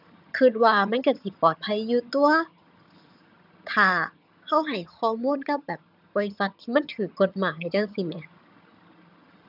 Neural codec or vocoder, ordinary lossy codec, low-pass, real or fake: vocoder, 22.05 kHz, 80 mel bands, HiFi-GAN; none; 5.4 kHz; fake